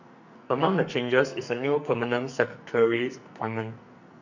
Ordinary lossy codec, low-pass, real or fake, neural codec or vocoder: none; 7.2 kHz; fake; codec, 44.1 kHz, 2.6 kbps, SNAC